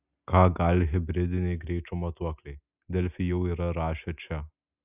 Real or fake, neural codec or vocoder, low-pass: real; none; 3.6 kHz